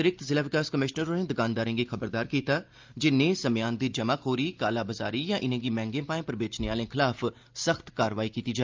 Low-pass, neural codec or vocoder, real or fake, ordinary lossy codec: 7.2 kHz; none; real; Opus, 24 kbps